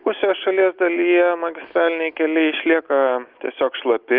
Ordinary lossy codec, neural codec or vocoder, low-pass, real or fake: Opus, 32 kbps; none; 5.4 kHz; real